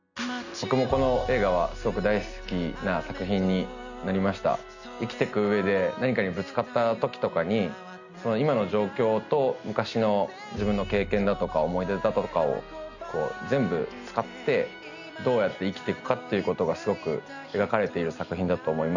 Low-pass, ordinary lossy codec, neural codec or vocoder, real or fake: 7.2 kHz; none; none; real